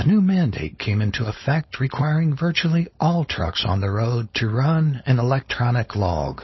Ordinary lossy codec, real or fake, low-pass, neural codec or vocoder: MP3, 24 kbps; fake; 7.2 kHz; codec, 16 kHz, 4.8 kbps, FACodec